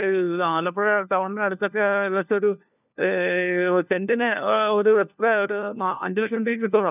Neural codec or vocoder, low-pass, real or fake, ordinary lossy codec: codec, 16 kHz, 1 kbps, FunCodec, trained on LibriTTS, 50 frames a second; 3.6 kHz; fake; none